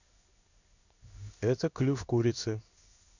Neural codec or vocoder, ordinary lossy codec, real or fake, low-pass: codec, 16 kHz in and 24 kHz out, 1 kbps, XY-Tokenizer; none; fake; 7.2 kHz